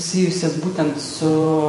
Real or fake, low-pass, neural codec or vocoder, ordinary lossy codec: fake; 14.4 kHz; vocoder, 48 kHz, 128 mel bands, Vocos; MP3, 48 kbps